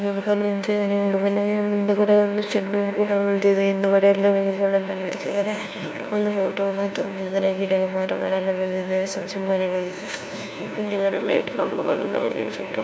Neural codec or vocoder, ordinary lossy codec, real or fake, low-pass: codec, 16 kHz, 1 kbps, FunCodec, trained on LibriTTS, 50 frames a second; none; fake; none